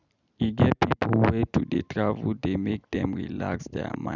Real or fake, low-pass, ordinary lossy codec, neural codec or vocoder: real; 7.2 kHz; none; none